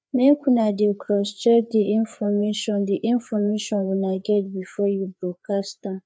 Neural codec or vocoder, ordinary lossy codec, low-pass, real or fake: codec, 16 kHz, 4 kbps, FreqCodec, larger model; none; none; fake